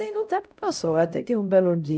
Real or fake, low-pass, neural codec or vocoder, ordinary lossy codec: fake; none; codec, 16 kHz, 0.5 kbps, X-Codec, HuBERT features, trained on LibriSpeech; none